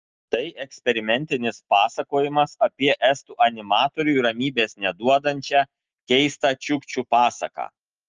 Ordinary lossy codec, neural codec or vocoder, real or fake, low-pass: Opus, 24 kbps; none; real; 7.2 kHz